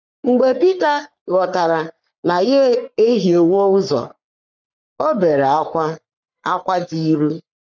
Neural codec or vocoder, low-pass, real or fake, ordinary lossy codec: codec, 44.1 kHz, 3.4 kbps, Pupu-Codec; 7.2 kHz; fake; none